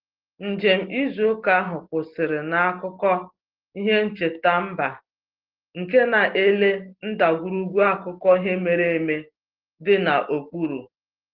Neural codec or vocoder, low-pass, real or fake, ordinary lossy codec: none; 5.4 kHz; real; Opus, 16 kbps